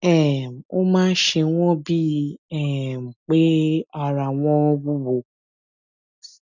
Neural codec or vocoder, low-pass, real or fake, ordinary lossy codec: none; 7.2 kHz; real; none